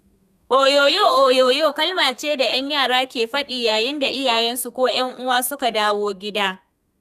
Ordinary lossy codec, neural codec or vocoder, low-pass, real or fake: none; codec, 32 kHz, 1.9 kbps, SNAC; 14.4 kHz; fake